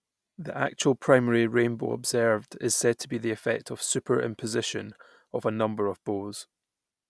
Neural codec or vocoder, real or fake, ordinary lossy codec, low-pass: none; real; none; none